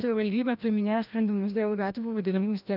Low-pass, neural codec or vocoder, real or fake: 5.4 kHz; codec, 16 kHz, 1 kbps, FreqCodec, larger model; fake